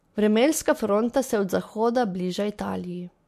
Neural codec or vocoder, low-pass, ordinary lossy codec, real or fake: none; 14.4 kHz; MP3, 64 kbps; real